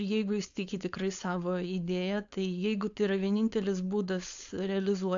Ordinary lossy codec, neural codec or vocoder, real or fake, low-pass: AAC, 64 kbps; codec, 16 kHz, 4.8 kbps, FACodec; fake; 7.2 kHz